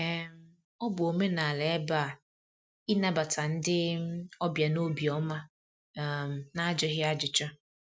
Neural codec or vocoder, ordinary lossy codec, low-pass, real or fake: none; none; none; real